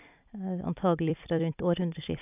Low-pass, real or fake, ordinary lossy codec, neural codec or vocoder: 3.6 kHz; fake; none; vocoder, 44.1 kHz, 128 mel bands every 256 samples, BigVGAN v2